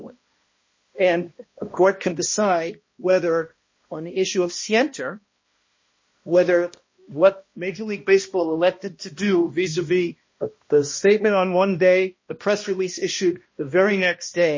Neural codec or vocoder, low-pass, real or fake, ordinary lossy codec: codec, 16 kHz, 1 kbps, X-Codec, HuBERT features, trained on balanced general audio; 7.2 kHz; fake; MP3, 32 kbps